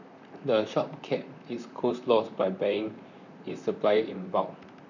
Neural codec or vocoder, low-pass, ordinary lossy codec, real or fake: vocoder, 44.1 kHz, 128 mel bands, Pupu-Vocoder; 7.2 kHz; none; fake